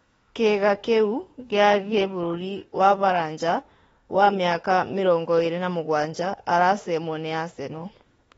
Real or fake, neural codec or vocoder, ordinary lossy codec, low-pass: fake; autoencoder, 48 kHz, 32 numbers a frame, DAC-VAE, trained on Japanese speech; AAC, 24 kbps; 19.8 kHz